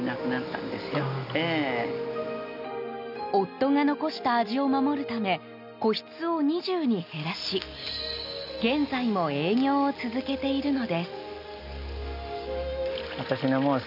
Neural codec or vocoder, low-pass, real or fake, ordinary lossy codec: none; 5.4 kHz; real; none